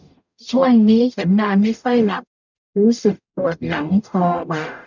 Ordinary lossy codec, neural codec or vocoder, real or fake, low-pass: none; codec, 44.1 kHz, 0.9 kbps, DAC; fake; 7.2 kHz